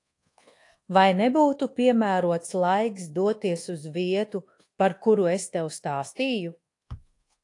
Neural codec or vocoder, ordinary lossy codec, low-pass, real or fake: codec, 24 kHz, 1.2 kbps, DualCodec; AAC, 48 kbps; 10.8 kHz; fake